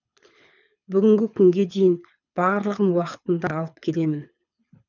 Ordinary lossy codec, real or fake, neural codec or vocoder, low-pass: none; fake; codec, 24 kHz, 6 kbps, HILCodec; 7.2 kHz